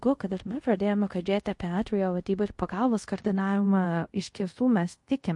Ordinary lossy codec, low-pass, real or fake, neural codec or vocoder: MP3, 48 kbps; 10.8 kHz; fake; codec, 24 kHz, 0.5 kbps, DualCodec